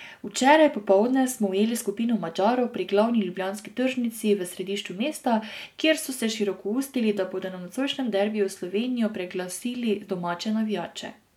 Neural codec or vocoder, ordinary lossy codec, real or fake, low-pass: none; MP3, 96 kbps; real; 19.8 kHz